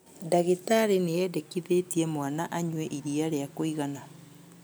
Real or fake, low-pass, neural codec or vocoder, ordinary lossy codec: real; none; none; none